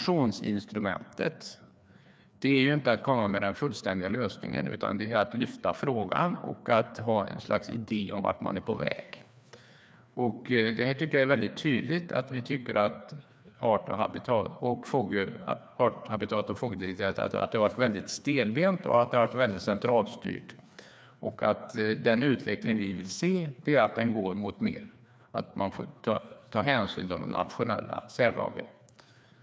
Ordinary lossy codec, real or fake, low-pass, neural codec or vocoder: none; fake; none; codec, 16 kHz, 2 kbps, FreqCodec, larger model